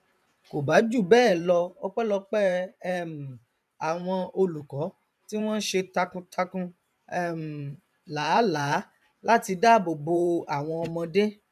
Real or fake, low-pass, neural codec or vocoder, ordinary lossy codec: fake; 14.4 kHz; vocoder, 44.1 kHz, 128 mel bands every 512 samples, BigVGAN v2; none